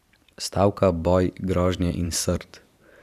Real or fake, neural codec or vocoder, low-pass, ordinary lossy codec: fake; vocoder, 44.1 kHz, 128 mel bands every 512 samples, BigVGAN v2; 14.4 kHz; none